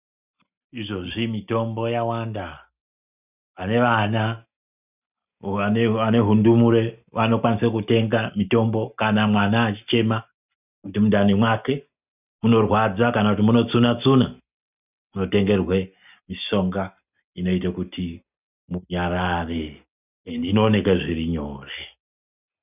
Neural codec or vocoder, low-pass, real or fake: none; 3.6 kHz; real